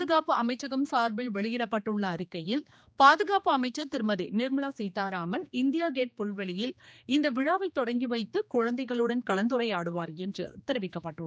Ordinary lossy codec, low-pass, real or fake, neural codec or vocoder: none; none; fake; codec, 16 kHz, 2 kbps, X-Codec, HuBERT features, trained on general audio